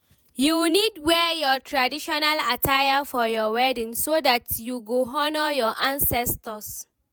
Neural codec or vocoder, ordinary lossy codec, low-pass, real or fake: vocoder, 48 kHz, 128 mel bands, Vocos; none; none; fake